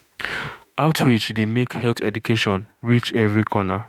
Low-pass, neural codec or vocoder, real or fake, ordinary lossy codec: 19.8 kHz; autoencoder, 48 kHz, 32 numbers a frame, DAC-VAE, trained on Japanese speech; fake; none